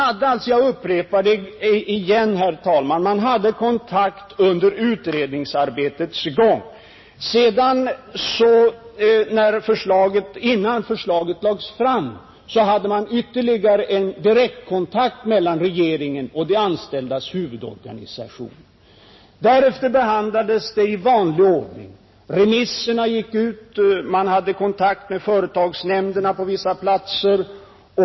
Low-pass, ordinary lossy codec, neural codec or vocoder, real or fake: 7.2 kHz; MP3, 24 kbps; none; real